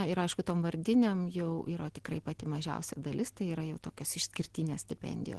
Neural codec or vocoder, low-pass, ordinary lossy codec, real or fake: none; 10.8 kHz; Opus, 16 kbps; real